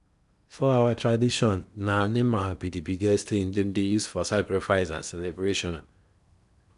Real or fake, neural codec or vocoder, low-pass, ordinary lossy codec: fake; codec, 16 kHz in and 24 kHz out, 0.8 kbps, FocalCodec, streaming, 65536 codes; 10.8 kHz; none